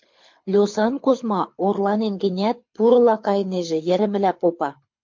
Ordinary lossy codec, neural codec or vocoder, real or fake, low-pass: MP3, 48 kbps; codec, 24 kHz, 6 kbps, HILCodec; fake; 7.2 kHz